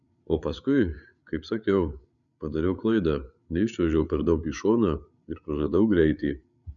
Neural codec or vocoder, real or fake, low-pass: codec, 16 kHz, 8 kbps, FreqCodec, larger model; fake; 7.2 kHz